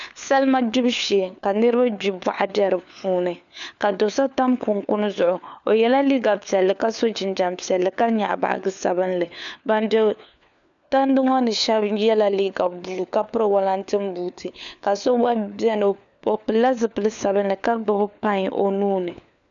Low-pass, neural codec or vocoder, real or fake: 7.2 kHz; codec, 16 kHz, 4 kbps, FunCodec, trained on LibriTTS, 50 frames a second; fake